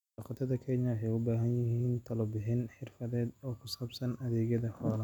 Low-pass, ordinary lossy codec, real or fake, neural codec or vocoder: 19.8 kHz; none; real; none